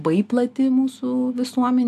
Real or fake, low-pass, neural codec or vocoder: real; 14.4 kHz; none